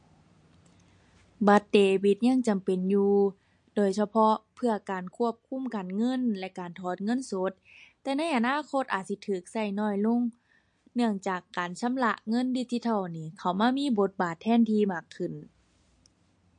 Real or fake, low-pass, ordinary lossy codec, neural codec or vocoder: real; 9.9 kHz; MP3, 48 kbps; none